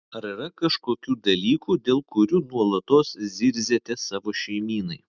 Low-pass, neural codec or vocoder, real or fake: 7.2 kHz; none; real